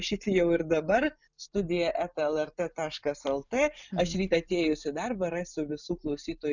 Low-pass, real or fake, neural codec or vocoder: 7.2 kHz; real; none